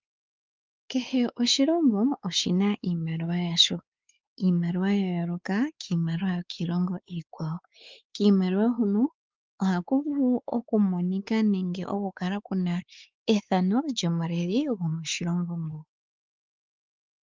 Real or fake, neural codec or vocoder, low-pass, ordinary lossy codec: fake; codec, 16 kHz, 4 kbps, X-Codec, WavLM features, trained on Multilingual LibriSpeech; 7.2 kHz; Opus, 32 kbps